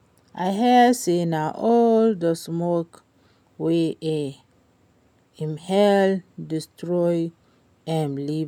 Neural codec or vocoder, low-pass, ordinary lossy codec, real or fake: none; 19.8 kHz; none; real